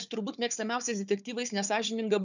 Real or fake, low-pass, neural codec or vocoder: fake; 7.2 kHz; codec, 16 kHz, 4 kbps, FunCodec, trained on Chinese and English, 50 frames a second